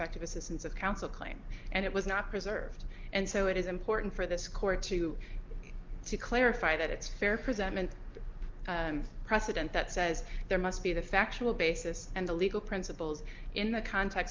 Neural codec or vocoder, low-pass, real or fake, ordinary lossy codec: none; 7.2 kHz; real; Opus, 32 kbps